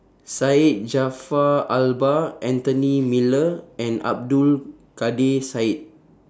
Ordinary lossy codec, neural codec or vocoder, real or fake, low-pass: none; none; real; none